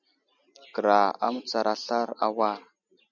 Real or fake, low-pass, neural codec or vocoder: real; 7.2 kHz; none